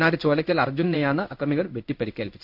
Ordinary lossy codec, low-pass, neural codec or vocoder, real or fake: none; 5.4 kHz; codec, 16 kHz in and 24 kHz out, 1 kbps, XY-Tokenizer; fake